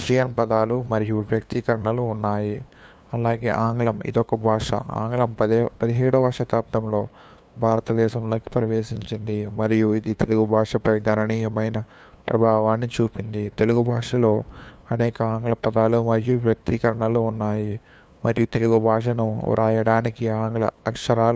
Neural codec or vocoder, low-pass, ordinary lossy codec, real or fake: codec, 16 kHz, 2 kbps, FunCodec, trained on LibriTTS, 25 frames a second; none; none; fake